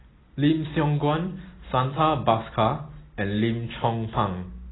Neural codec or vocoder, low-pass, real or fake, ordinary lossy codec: none; 7.2 kHz; real; AAC, 16 kbps